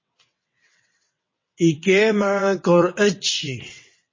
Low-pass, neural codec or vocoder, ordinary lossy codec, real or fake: 7.2 kHz; vocoder, 22.05 kHz, 80 mel bands, WaveNeXt; MP3, 32 kbps; fake